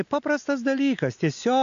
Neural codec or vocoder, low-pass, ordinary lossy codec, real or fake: none; 7.2 kHz; AAC, 64 kbps; real